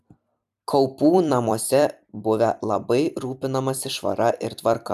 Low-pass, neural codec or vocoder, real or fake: 14.4 kHz; vocoder, 44.1 kHz, 128 mel bands every 256 samples, BigVGAN v2; fake